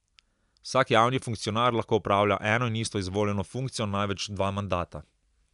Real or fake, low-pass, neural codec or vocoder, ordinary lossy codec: real; 10.8 kHz; none; none